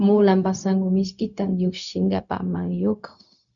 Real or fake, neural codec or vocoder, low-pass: fake; codec, 16 kHz, 0.4 kbps, LongCat-Audio-Codec; 7.2 kHz